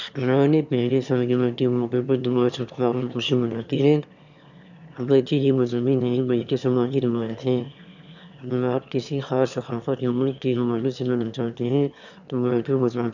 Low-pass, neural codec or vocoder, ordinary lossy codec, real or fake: 7.2 kHz; autoencoder, 22.05 kHz, a latent of 192 numbers a frame, VITS, trained on one speaker; none; fake